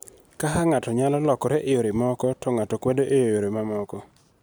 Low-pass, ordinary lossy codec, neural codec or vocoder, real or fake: none; none; none; real